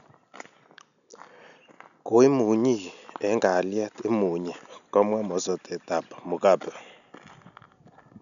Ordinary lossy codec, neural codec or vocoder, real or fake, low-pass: none; none; real; 7.2 kHz